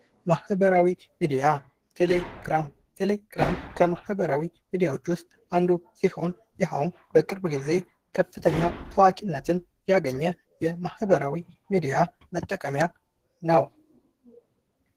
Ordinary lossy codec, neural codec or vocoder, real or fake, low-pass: Opus, 16 kbps; codec, 32 kHz, 1.9 kbps, SNAC; fake; 14.4 kHz